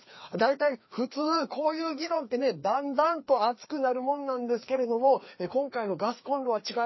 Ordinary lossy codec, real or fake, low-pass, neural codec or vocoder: MP3, 24 kbps; fake; 7.2 kHz; codec, 16 kHz, 2 kbps, FreqCodec, larger model